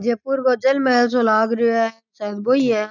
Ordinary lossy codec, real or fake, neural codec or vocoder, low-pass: none; real; none; 7.2 kHz